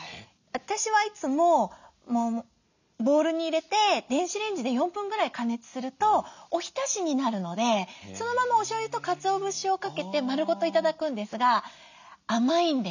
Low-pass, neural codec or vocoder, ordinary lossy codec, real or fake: 7.2 kHz; none; none; real